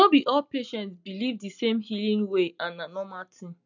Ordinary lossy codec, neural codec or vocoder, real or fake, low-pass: none; none; real; 7.2 kHz